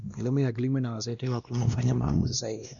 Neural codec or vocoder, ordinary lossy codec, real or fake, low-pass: codec, 16 kHz, 1 kbps, X-Codec, WavLM features, trained on Multilingual LibriSpeech; none; fake; 7.2 kHz